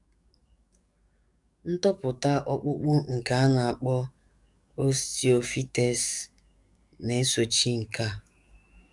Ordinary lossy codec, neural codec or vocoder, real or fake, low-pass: none; codec, 44.1 kHz, 7.8 kbps, DAC; fake; 10.8 kHz